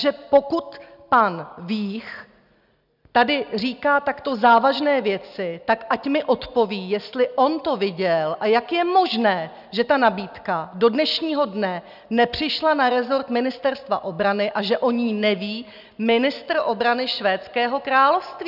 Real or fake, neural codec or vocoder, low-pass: real; none; 5.4 kHz